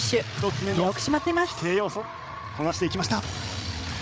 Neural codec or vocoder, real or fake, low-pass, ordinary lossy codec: codec, 16 kHz, 8 kbps, FreqCodec, larger model; fake; none; none